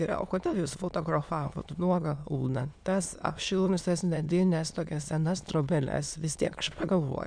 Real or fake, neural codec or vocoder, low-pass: fake; autoencoder, 22.05 kHz, a latent of 192 numbers a frame, VITS, trained on many speakers; 9.9 kHz